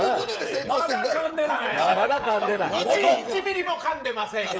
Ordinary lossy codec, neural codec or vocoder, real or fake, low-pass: none; codec, 16 kHz, 16 kbps, FreqCodec, smaller model; fake; none